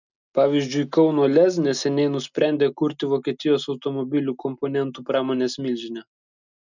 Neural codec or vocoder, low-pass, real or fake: none; 7.2 kHz; real